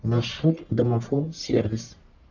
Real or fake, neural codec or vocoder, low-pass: fake; codec, 44.1 kHz, 1.7 kbps, Pupu-Codec; 7.2 kHz